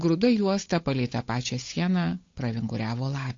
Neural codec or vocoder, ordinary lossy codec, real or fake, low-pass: codec, 16 kHz, 8 kbps, FunCodec, trained on Chinese and English, 25 frames a second; AAC, 48 kbps; fake; 7.2 kHz